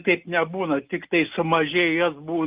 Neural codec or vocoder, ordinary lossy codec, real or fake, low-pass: none; Opus, 32 kbps; real; 3.6 kHz